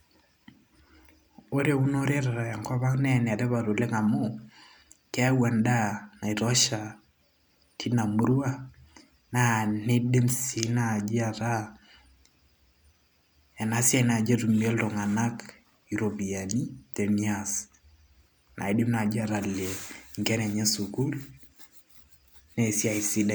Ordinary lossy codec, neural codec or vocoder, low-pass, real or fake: none; none; none; real